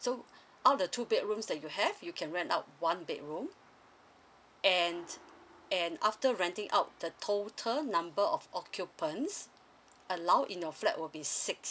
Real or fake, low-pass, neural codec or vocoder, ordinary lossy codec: real; none; none; none